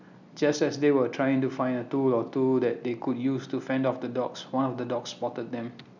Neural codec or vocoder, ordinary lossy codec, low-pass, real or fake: none; none; 7.2 kHz; real